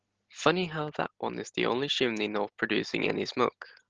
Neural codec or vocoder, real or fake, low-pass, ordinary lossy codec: none; real; 7.2 kHz; Opus, 16 kbps